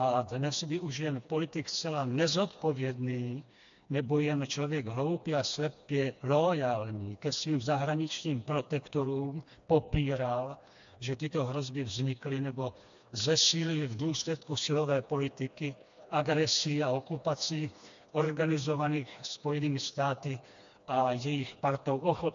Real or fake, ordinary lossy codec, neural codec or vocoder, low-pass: fake; AAC, 64 kbps; codec, 16 kHz, 2 kbps, FreqCodec, smaller model; 7.2 kHz